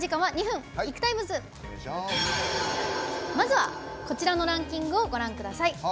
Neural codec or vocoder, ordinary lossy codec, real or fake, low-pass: none; none; real; none